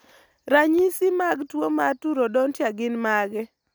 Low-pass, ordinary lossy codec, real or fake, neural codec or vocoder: none; none; real; none